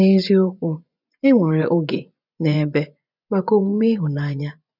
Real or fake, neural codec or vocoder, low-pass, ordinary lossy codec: fake; vocoder, 24 kHz, 100 mel bands, Vocos; 5.4 kHz; none